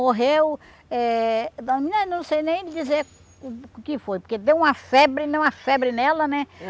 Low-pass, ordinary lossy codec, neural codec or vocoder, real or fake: none; none; none; real